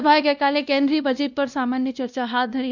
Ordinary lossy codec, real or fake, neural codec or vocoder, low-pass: none; fake; codec, 16 kHz, 1 kbps, X-Codec, WavLM features, trained on Multilingual LibriSpeech; 7.2 kHz